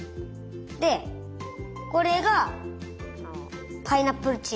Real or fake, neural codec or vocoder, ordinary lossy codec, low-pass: real; none; none; none